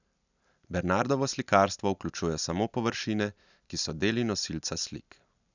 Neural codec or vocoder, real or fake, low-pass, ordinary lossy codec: none; real; 7.2 kHz; none